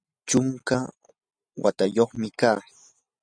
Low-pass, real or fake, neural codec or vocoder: 9.9 kHz; real; none